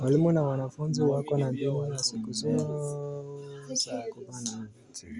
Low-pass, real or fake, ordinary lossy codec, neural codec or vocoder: 10.8 kHz; real; Opus, 64 kbps; none